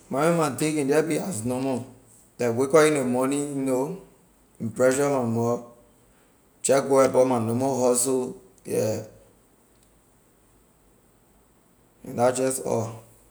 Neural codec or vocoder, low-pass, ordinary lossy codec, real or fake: none; none; none; real